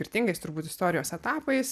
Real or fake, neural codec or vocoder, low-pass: real; none; 14.4 kHz